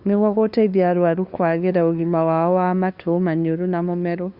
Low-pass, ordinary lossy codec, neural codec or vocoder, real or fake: 5.4 kHz; Opus, 64 kbps; codec, 16 kHz, 2 kbps, FunCodec, trained on LibriTTS, 25 frames a second; fake